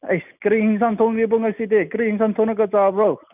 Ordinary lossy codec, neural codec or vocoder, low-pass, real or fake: none; none; 3.6 kHz; real